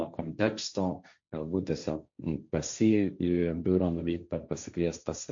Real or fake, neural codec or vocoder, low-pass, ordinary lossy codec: fake; codec, 16 kHz, 1.1 kbps, Voila-Tokenizer; 7.2 kHz; MP3, 48 kbps